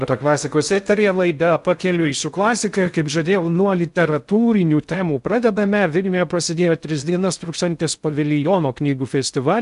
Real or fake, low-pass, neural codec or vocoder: fake; 10.8 kHz; codec, 16 kHz in and 24 kHz out, 0.6 kbps, FocalCodec, streaming, 2048 codes